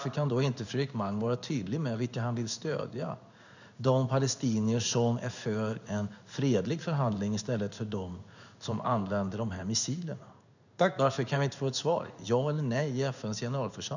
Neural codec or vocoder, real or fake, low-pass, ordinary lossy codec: codec, 16 kHz in and 24 kHz out, 1 kbps, XY-Tokenizer; fake; 7.2 kHz; none